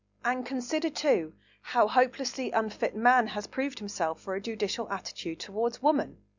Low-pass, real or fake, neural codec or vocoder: 7.2 kHz; real; none